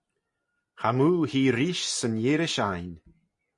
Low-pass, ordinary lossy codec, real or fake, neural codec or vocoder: 10.8 kHz; MP3, 48 kbps; real; none